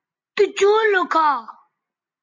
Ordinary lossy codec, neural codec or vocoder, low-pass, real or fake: MP3, 32 kbps; none; 7.2 kHz; real